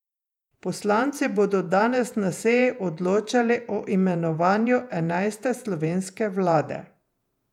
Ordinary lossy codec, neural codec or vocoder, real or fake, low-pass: none; vocoder, 48 kHz, 128 mel bands, Vocos; fake; 19.8 kHz